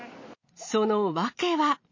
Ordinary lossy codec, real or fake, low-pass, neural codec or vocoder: MP3, 32 kbps; real; 7.2 kHz; none